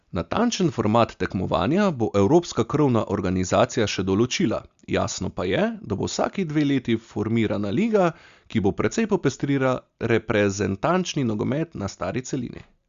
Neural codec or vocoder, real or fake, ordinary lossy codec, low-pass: none; real; Opus, 64 kbps; 7.2 kHz